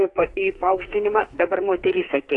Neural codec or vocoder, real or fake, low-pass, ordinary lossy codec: codec, 44.1 kHz, 2.6 kbps, SNAC; fake; 10.8 kHz; AAC, 48 kbps